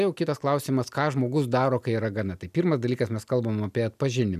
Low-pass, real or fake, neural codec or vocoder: 14.4 kHz; real; none